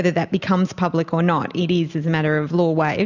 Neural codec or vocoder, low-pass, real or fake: none; 7.2 kHz; real